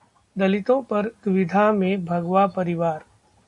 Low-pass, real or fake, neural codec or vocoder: 10.8 kHz; real; none